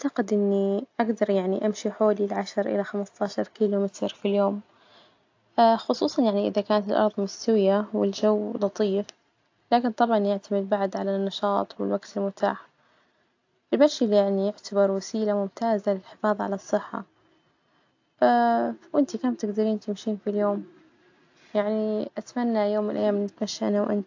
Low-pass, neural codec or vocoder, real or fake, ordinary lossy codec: 7.2 kHz; none; real; AAC, 48 kbps